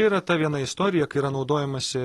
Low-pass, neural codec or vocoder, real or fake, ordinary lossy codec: 19.8 kHz; none; real; AAC, 32 kbps